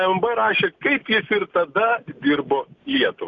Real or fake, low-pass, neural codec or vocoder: real; 7.2 kHz; none